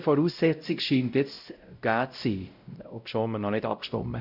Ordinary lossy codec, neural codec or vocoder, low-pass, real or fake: none; codec, 16 kHz, 0.5 kbps, X-Codec, WavLM features, trained on Multilingual LibriSpeech; 5.4 kHz; fake